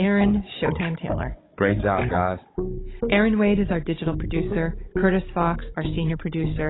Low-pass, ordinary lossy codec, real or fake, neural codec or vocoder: 7.2 kHz; AAC, 16 kbps; fake; codec, 16 kHz, 16 kbps, FunCodec, trained on Chinese and English, 50 frames a second